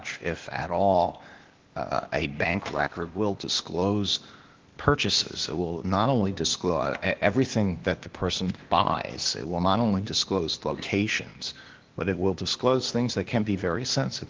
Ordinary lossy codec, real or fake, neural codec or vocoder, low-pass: Opus, 16 kbps; fake; codec, 16 kHz, 0.8 kbps, ZipCodec; 7.2 kHz